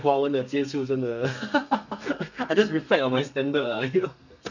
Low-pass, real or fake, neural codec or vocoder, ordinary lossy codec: 7.2 kHz; fake; codec, 32 kHz, 1.9 kbps, SNAC; none